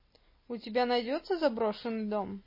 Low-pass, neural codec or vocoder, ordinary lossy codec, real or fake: 5.4 kHz; none; MP3, 24 kbps; real